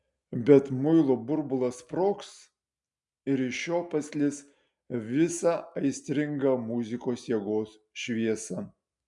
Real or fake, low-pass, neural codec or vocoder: real; 10.8 kHz; none